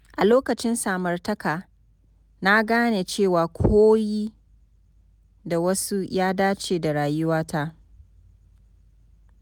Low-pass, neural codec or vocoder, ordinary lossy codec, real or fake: 19.8 kHz; none; none; real